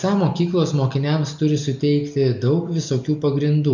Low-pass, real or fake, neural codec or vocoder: 7.2 kHz; real; none